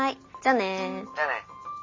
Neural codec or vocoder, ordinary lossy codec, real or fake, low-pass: none; MP3, 32 kbps; real; 7.2 kHz